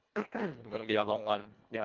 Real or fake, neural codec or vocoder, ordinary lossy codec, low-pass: fake; codec, 24 kHz, 1.5 kbps, HILCodec; Opus, 32 kbps; 7.2 kHz